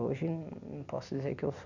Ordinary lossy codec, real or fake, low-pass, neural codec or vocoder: none; real; 7.2 kHz; none